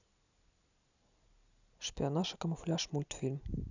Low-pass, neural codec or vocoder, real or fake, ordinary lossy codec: 7.2 kHz; none; real; none